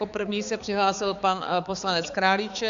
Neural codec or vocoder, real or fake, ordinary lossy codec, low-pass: codec, 16 kHz, 4 kbps, X-Codec, HuBERT features, trained on balanced general audio; fake; Opus, 64 kbps; 7.2 kHz